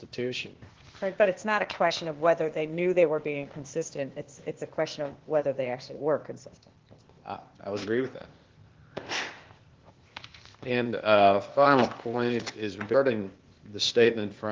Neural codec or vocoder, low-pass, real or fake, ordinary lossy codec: codec, 16 kHz, 0.8 kbps, ZipCodec; 7.2 kHz; fake; Opus, 16 kbps